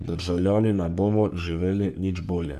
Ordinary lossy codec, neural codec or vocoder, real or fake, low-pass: none; codec, 44.1 kHz, 3.4 kbps, Pupu-Codec; fake; 14.4 kHz